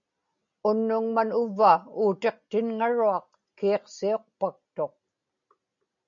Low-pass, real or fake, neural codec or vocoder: 7.2 kHz; real; none